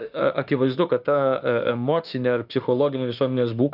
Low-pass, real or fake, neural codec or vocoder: 5.4 kHz; fake; autoencoder, 48 kHz, 32 numbers a frame, DAC-VAE, trained on Japanese speech